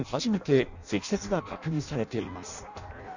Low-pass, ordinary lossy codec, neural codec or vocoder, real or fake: 7.2 kHz; none; codec, 16 kHz in and 24 kHz out, 0.6 kbps, FireRedTTS-2 codec; fake